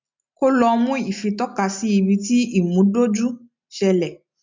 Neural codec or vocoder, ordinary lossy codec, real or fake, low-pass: none; MP3, 64 kbps; real; 7.2 kHz